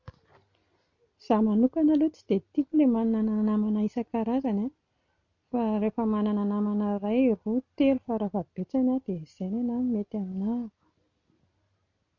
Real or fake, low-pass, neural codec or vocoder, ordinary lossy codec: real; 7.2 kHz; none; none